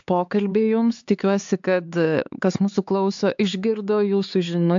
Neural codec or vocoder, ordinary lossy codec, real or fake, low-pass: codec, 16 kHz, 4 kbps, X-Codec, HuBERT features, trained on LibriSpeech; AAC, 64 kbps; fake; 7.2 kHz